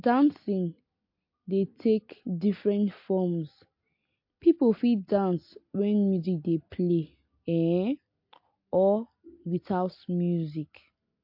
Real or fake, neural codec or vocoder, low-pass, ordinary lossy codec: real; none; 5.4 kHz; MP3, 32 kbps